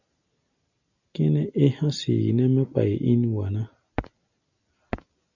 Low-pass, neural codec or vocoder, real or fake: 7.2 kHz; none; real